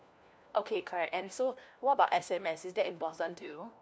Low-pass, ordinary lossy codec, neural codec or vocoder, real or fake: none; none; codec, 16 kHz, 1 kbps, FunCodec, trained on LibriTTS, 50 frames a second; fake